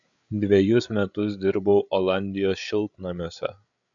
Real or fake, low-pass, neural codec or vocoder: fake; 7.2 kHz; codec, 16 kHz, 8 kbps, FreqCodec, larger model